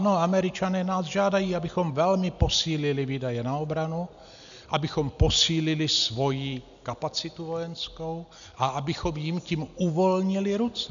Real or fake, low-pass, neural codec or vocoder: real; 7.2 kHz; none